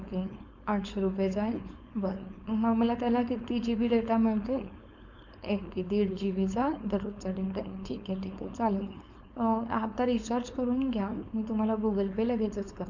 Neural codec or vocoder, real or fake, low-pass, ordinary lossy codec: codec, 16 kHz, 4.8 kbps, FACodec; fake; 7.2 kHz; none